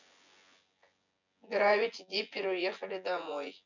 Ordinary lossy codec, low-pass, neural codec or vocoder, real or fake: none; 7.2 kHz; vocoder, 24 kHz, 100 mel bands, Vocos; fake